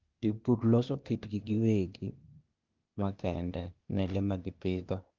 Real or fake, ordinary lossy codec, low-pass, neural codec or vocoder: fake; Opus, 32 kbps; 7.2 kHz; codec, 16 kHz, 0.8 kbps, ZipCodec